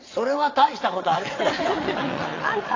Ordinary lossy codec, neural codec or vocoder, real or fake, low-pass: AAC, 32 kbps; vocoder, 22.05 kHz, 80 mel bands, WaveNeXt; fake; 7.2 kHz